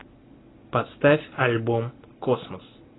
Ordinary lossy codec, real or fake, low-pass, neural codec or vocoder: AAC, 16 kbps; real; 7.2 kHz; none